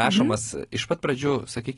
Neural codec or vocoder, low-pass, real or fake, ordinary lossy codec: none; 10.8 kHz; real; AAC, 32 kbps